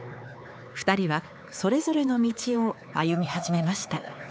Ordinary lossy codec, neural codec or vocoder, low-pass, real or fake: none; codec, 16 kHz, 4 kbps, X-Codec, HuBERT features, trained on LibriSpeech; none; fake